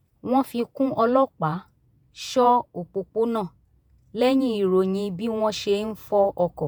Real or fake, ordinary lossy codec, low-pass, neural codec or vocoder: fake; none; none; vocoder, 48 kHz, 128 mel bands, Vocos